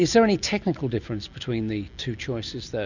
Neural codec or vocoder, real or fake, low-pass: none; real; 7.2 kHz